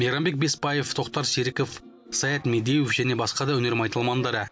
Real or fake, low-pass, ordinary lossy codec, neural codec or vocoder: real; none; none; none